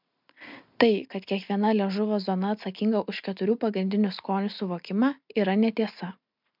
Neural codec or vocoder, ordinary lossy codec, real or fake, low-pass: none; MP3, 48 kbps; real; 5.4 kHz